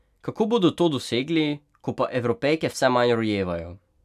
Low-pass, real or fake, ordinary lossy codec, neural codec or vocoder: 14.4 kHz; real; none; none